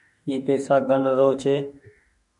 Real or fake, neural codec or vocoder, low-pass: fake; autoencoder, 48 kHz, 32 numbers a frame, DAC-VAE, trained on Japanese speech; 10.8 kHz